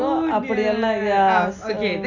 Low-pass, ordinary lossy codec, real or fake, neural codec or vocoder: 7.2 kHz; none; real; none